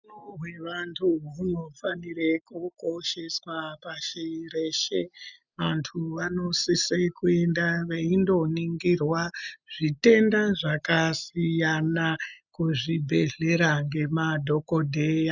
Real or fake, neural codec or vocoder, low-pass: real; none; 7.2 kHz